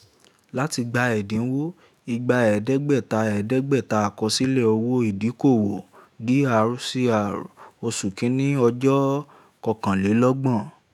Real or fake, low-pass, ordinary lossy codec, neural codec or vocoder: fake; 19.8 kHz; none; autoencoder, 48 kHz, 128 numbers a frame, DAC-VAE, trained on Japanese speech